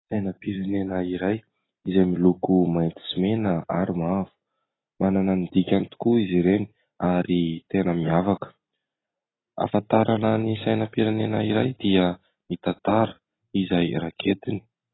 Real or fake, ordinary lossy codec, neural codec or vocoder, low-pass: real; AAC, 16 kbps; none; 7.2 kHz